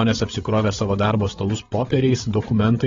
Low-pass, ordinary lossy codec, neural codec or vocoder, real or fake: 7.2 kHz; AAC, 24 kbps; codec, 16 kHz, 16 kbps, FreqCodec, larger model; fake